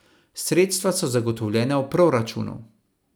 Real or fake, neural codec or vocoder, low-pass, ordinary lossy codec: real; none; none; none